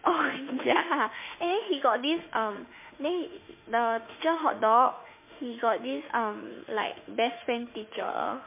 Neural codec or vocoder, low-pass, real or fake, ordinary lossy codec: autoencoder, 48 kHz, 32 numbers a frame, DAC-VAE, trained on Japanese speech; 3.6 kHz; fake; MP3, 24 kbps